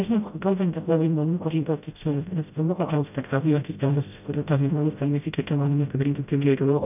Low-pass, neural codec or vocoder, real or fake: 3.6 kHz; codec, 16 kHz, 0.5 kbps, FreqCodec, smaller model; fake